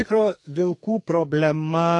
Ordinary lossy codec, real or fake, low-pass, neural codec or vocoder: AAC, 48 kbps; fake; 10.8 kHz; codec, 44.1 kHz, 2.6 kbps, SNAC